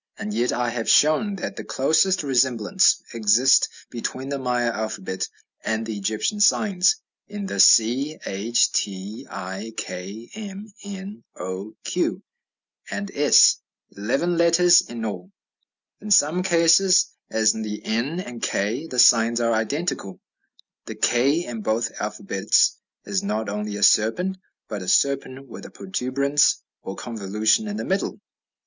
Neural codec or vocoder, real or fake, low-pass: none; real; 7.2 kHz